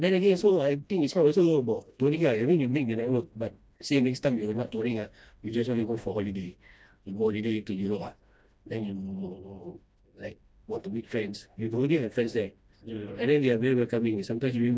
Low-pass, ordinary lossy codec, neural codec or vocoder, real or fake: none; none; codec, 16 kHz, 1 kbps, FreqCodec, smaller model; fake